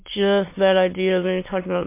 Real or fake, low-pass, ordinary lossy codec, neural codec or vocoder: fake; 3.6 kHz; MP3, 24 kbps; autoencoder, 22.05 kHz, a latent of 192 numbers a frame, VITS, trained on many speakers